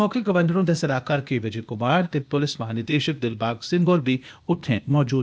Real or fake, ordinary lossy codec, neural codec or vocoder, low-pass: fake; none; codec, 16 kHz, 0.8 kbps, ZipCodec; none